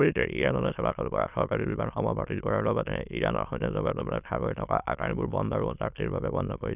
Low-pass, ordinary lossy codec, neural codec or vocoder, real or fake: 3.6 kHz; none; autoencoder, 22.05 kHz, a latent of 192 numbers a frame, VITS, trained on many speakers; fake